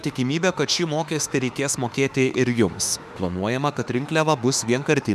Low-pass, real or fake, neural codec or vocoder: 14.4 kHz; fake; autoencoder, 48 kHz, 32 numbers a frame, DAC-VAE, trained on Japanese speech